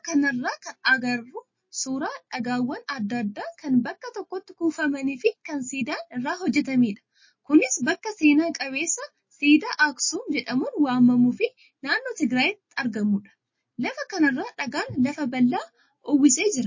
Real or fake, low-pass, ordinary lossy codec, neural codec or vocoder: real; 7.2 kHz; MP3, 32 kbps; none